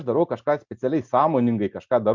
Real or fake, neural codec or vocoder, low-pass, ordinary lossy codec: real; none; 7.2 kHz; Opus, 64 kbps